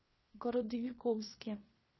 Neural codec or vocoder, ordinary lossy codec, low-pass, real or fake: codec, 16 kHz in and 24 kHz out, 0.9 kbps, LongCat-Audio-Codec, fine tuned four codebook decoder; MP3, 24 kbps; 7.2 kHz; fake